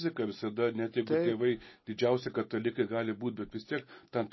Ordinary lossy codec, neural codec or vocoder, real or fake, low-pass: MP3, 24 kbps; none; real; 7.2 kHz